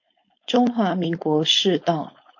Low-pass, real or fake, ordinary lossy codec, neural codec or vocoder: 7.2 kHz; fake; MP3, 48 kbps; codec, 16 kHz, 4.8 kbps, FACodec